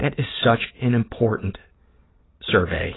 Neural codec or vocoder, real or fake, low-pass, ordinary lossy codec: none; real; 7.2 kHz; AAC, 16 kbps